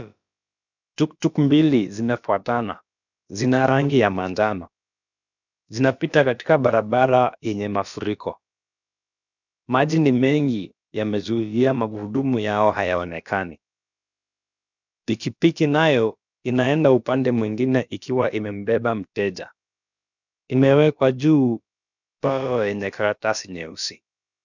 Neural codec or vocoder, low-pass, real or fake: codec, 16 kHz, about 1 kbps, DyCAST, with the encoder's durations; 7.2 kHz; fake